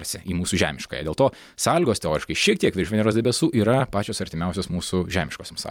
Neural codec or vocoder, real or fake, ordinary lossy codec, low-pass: vocoder, 44.1 kHz, 128 mel bands every 256 samples, BigVGAN v2; fake; MP3, 96 kbps; 19.8 kHz